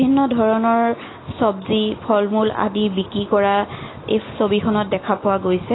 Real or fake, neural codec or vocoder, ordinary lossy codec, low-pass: real; none; AAC, 16 kbps; 7.2 kHz